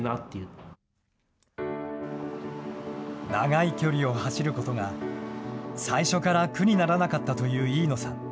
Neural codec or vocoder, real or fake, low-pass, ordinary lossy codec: none; real; none; none